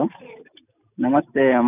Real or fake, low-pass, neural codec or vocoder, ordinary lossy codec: real; 3.6 kHz; none; none